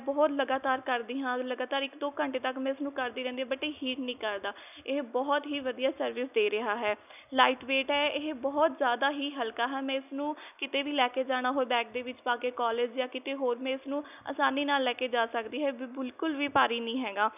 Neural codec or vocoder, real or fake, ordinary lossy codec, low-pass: none; real; none; 3.6 kHz